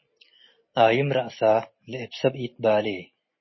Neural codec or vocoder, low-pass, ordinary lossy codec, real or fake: none; 7.2 kHz; MP3, 24 kbps; real